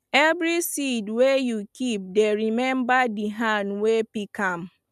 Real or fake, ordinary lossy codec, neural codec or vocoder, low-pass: real; none; none; 14.4 kHz